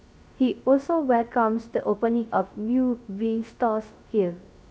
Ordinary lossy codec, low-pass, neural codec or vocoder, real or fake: none; none; codec, 16 kHz, 0.3 kbps, FocalCodec; fake